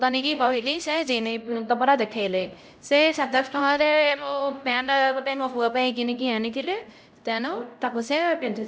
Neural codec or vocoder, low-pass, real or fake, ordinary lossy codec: codec, 16 kHz, 0.5 kbps, X-Codec, HuBERT features, trained on LibriSpeech; none; fake; none